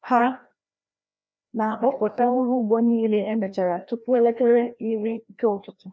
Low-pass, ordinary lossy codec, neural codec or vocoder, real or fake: none; none; codec, 16 kHz, 1 kbps, FreqCodec, larger model; fake